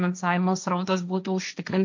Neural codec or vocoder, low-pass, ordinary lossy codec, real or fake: codec, 16 kHz, 1.1 kbps, Voila-Tokenizer; 7.2 kHz; MP3, 64 kbps; fake